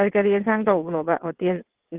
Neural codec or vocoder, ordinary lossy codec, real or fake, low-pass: vocoder, 22.05 kHz, 80 mel bands, WaveNeXt; Opus, 16 kbps; fake; 3.6 kHz